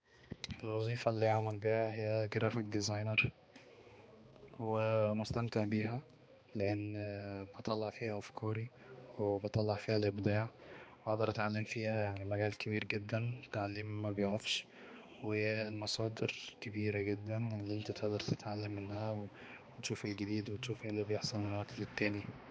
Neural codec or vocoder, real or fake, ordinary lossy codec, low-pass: codec, 16 kHz, 2 kbps, X-Codec, HuBERT features, trained on balanced general audio; fake; none; none